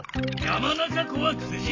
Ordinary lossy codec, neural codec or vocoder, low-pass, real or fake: AAC, 32 kbps; none; 7.2 kHz; real